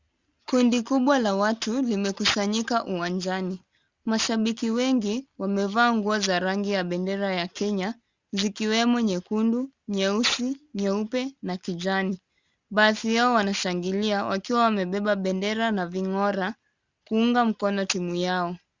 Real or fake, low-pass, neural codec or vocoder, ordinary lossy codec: real; 7.2 kHz; none; Opus, 64 kbps